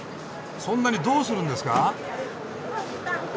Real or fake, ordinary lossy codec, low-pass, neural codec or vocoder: real; none; none; none